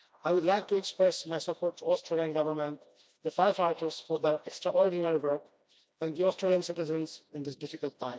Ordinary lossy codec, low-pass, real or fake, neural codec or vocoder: none; none; fake; codec, 16 kHz, 1 kbps, FreqCodec, smaller model